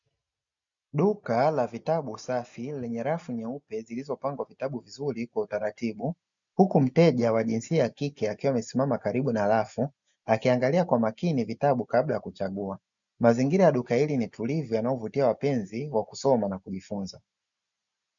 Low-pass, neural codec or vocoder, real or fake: 7.2 kHz; none; real